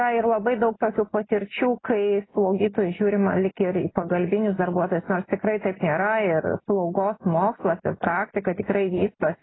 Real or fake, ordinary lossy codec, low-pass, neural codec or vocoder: real; AAC, 16 kbps; 7.2 kHz; none